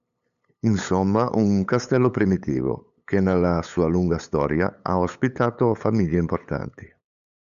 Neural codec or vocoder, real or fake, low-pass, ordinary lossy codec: codec, 16 kHz, 8 kbps, FunCodec, trained on LibriTTS, 25 frames a second; fake; 7.2 kHz; MP3, 96 kbps